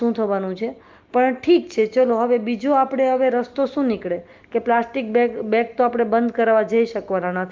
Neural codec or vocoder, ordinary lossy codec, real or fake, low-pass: none; Opus, 32 kbps; real; 7.2 kHz